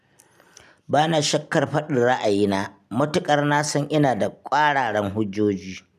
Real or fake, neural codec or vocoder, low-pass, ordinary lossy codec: real; none; 14.4 kHz; none